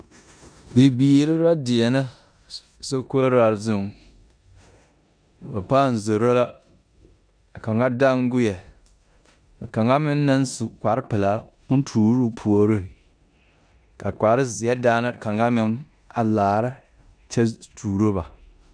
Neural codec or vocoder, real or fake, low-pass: codec, 16 kHz in and 24 kHz out, 0.9 kbps, LongCat-Audio-Codec, four codebook decoder; fake; 9.9 kHz